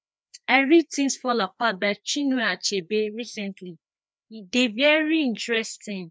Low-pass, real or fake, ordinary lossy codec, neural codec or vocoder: none; fake; none; codec, 16 kHz, 2 kbps, FreqCodec, larger model